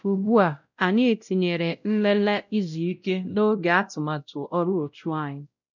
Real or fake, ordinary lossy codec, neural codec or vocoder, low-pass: fake; none; codec, 16 kHz, 0.5 kbps, X-Codec, WavLM features, trained on Multilingual LibriSpeech; 7.2 kHz